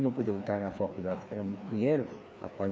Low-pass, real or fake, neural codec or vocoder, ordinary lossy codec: none; fake; codec, 16 kHz, 2 kbps, FreqCodec, larger model; none